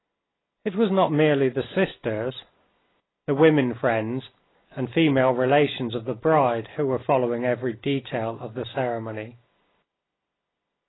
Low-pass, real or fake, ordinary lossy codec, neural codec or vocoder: 7.2 kHz; real; AAC, 16 kbps; none